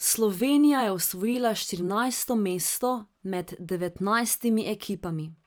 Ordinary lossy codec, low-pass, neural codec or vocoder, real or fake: none; none; vocoder, 44.1 kHz, 128 mel bands every 512 samples, BigVGAN v2; fake